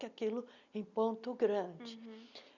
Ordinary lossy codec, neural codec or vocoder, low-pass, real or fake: none; none; 7.2 kHz; real